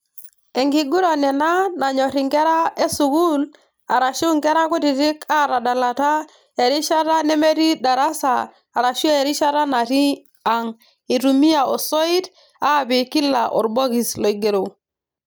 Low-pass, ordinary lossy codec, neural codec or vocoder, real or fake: none; none; none; real